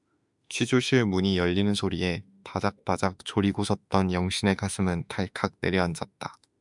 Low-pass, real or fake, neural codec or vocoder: 10.8 kHz; fake; autoencoder, 48 kHz, 32 numbers a frame, DAC-VAE, trained on Japanese speech